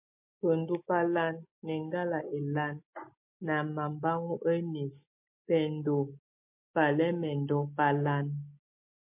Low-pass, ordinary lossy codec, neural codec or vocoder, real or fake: 3.6 kHz; MP3, 32 kbps; none; real